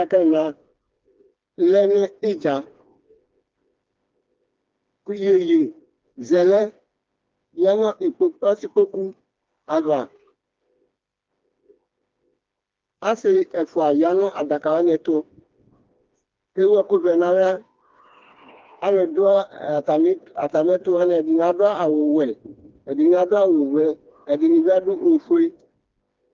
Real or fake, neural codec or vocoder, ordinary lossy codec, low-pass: fake; codec, 16 kHz, 2 kbps, FreqCodec, smaller model; Opus, 24 kbps; 7.2 kHz